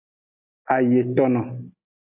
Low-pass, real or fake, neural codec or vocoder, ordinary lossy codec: 3.6 kHz; real; none; AAC, 32 kbps